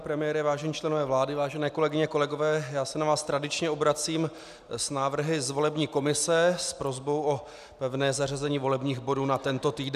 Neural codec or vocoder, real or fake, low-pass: none; real; 14.4 kHz